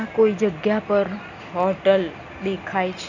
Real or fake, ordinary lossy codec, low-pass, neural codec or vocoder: real; none; 7.2 kHz; none